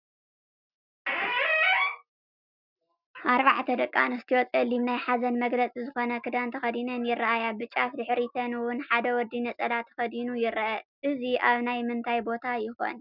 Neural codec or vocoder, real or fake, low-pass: none; real; 5.4 kHz